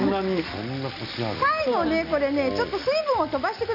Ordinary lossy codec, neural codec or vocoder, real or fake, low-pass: none; none; real; 5.4 kHz